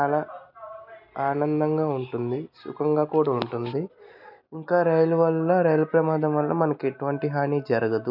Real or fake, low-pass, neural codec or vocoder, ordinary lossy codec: real; 5.4 kHz; none; none